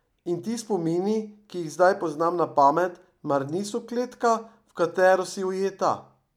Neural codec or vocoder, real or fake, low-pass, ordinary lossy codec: none; real; 19.8 kHz; none